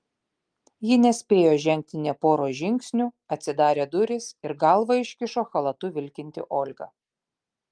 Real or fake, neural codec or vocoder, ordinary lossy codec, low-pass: real; none; Opus, 32 kbps; 9.9 kHz